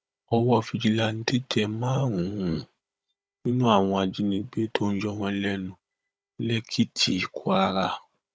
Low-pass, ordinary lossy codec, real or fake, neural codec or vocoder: none; none; fake; codec, 16 kHz, 16 kbps, FunCodec, trained on Chinese and English, 50 frames a second